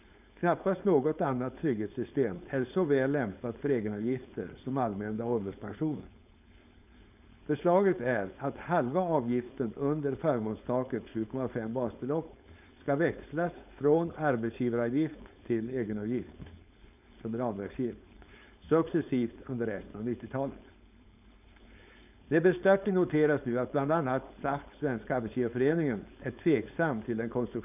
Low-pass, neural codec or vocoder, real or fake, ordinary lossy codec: 3.6 kHz; codec, 16 kHz, 4.8 kbps, FACodec; fake; none